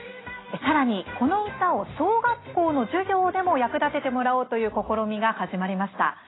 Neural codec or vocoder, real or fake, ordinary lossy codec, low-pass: none; real; AAC, 16 kbps; 7.2 kHz